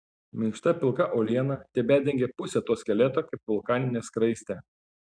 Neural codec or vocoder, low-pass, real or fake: none; 9.9 kHz; real